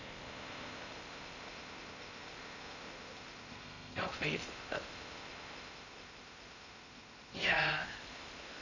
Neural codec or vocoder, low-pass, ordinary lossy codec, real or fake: codec, 16 kHz in and 24 kHz out, 0.8 kbps, FocalCodec, streaming, 65536 codes; 7.2 kHz; none; fake